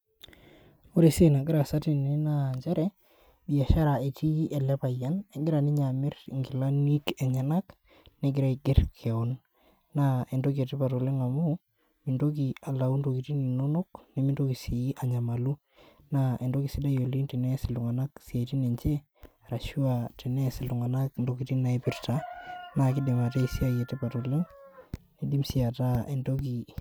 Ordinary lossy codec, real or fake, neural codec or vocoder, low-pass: none; real; none; none